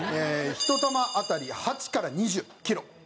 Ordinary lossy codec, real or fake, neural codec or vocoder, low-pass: none; real; none; none